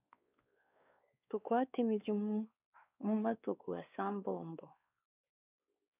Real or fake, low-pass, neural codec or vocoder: fake; 3.6 kHz; codec, 16 kHz, 2 kbps, X-Codec, WavLM features, trained on Multilingual LibriSpeech